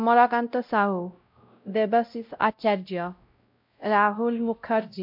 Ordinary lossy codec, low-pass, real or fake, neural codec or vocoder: MP3, 48 kbps; 5.4 kHz; fake; codec, 16 kHz, 0.5 kbps, X-Codec, WavLM features, trained on Multilingual LibriSpeech